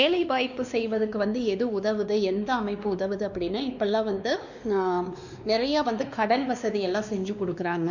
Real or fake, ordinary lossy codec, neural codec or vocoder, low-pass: fake; none; codec, 16 kHz, 2 kbps, X-Codec, WavLM features, trained on Multilingual LibriSpeech; 7.2 kHz